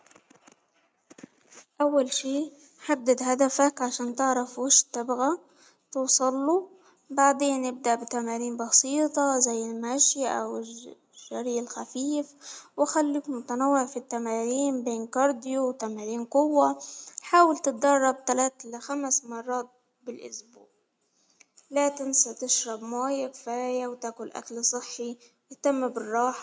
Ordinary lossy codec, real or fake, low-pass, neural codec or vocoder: none; real; none; none